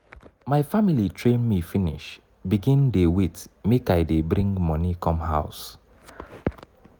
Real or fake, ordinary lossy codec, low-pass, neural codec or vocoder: real; none; none; none